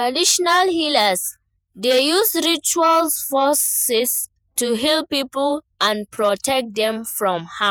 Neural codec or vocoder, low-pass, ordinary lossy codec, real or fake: vocoder, 48 kHz, 128 mel bands, Vocos; none; none; fake